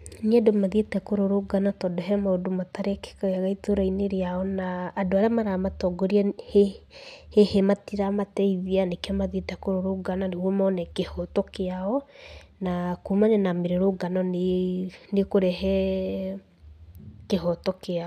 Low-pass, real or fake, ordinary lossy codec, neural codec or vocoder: 14.4 kHz; real; none; none